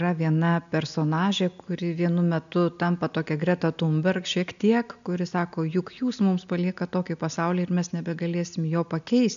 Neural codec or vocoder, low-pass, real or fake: none; 7.2 kHz; real